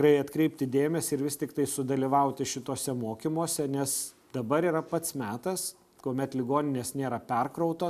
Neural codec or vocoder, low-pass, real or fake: none; 14.4 kHz; real